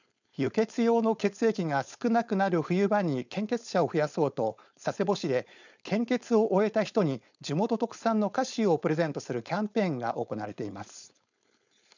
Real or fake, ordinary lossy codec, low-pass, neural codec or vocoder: fake; none; 7.2 kHz; codec, 16 kHz, 4.8 kbps, FACodec